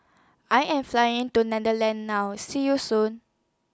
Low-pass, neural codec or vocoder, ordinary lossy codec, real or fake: none; none; none; real